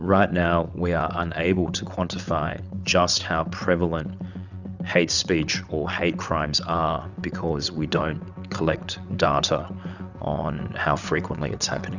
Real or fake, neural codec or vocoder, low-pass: fake; vocoder, 22.05 kHz, 80 mel bands, WaveNeXt; 7.2 kHz